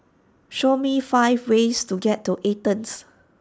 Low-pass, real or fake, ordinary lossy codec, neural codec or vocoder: none; real; none; none